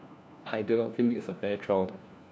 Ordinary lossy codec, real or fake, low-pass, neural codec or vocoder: none; fake; none; codec, 16 kHz, 1 kbps, FunCodec, trained on LibriTTS, 50 frames a second